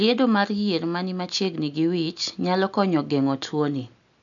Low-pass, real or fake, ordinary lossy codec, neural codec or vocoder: 7.2 kHz; real; none; none